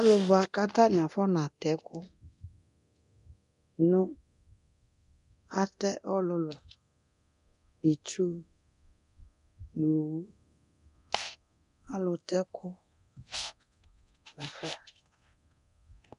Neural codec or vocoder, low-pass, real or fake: codec, 24 kHz, 0.9 kbps, DualCodec; 10.8 kHz; fake